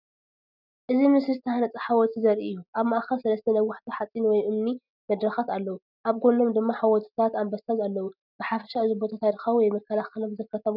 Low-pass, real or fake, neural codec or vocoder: 5.4 kHz; real; none